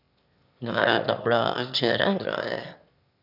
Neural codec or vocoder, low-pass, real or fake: autoencoder, 22.05 kHz, a latent of 192 numbers a frame, VITS, trained on one speaker; 5.4 kHz; fake